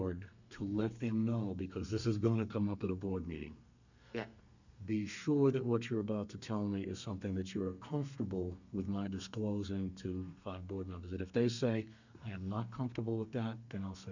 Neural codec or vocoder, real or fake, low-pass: codec, 32 kHz, 1.9 kbps, SNAC; fake; 7.2 kHz